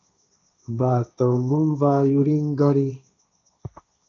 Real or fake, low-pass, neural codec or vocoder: fake; 7.2 kHz; codec, 16 kHz, 1.1 kbps, Voila-Tokenizer